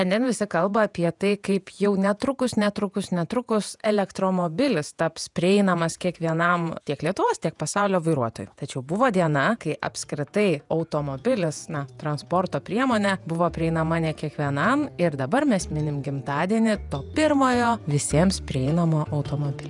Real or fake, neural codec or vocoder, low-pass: fake; vocoder, 48 kHz, 128 mel bands, Vocos; 10.8 kHz